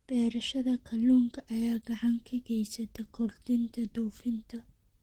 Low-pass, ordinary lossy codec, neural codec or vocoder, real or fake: 19.8 kHz; Opus, 16 kbps; vocoder, 44.1 kHz, 128 mel bands, Pupu-Vocoder; fake